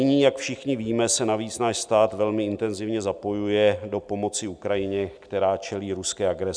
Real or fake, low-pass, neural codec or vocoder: real; 9.9 kHz; none